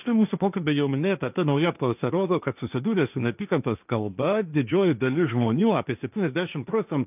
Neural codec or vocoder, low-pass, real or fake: codec, 16 kHz, 1.1 kbps, Voila-Tokenizer; 3.6 kHz; fake